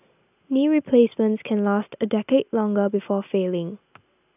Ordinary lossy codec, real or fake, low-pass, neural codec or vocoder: none; real; 3.6 kHz; none